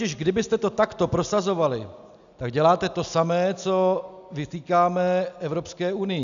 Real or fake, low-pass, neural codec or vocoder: real; 7.2 kHz; none